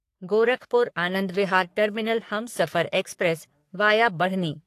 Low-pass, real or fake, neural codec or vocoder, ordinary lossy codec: 14.4 kHz; fake; codec, 44.1 kHz, 3.4 kbps, Pupu-Codec; AAC, 64 kbps